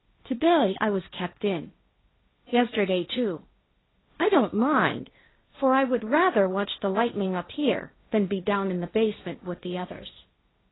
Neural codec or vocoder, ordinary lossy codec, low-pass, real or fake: codec, 16 kHz, 1.1 kbps, Voila-Tokenizer; AAC, 16 kbps; 7.2 kHz; fake